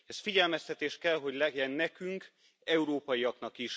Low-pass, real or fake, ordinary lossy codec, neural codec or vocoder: none; real; none; none